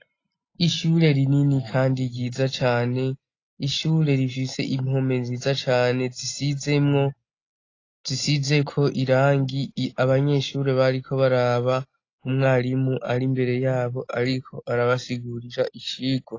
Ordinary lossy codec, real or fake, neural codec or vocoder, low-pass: AAC, 32 kbps; real; none; 7.2 kHz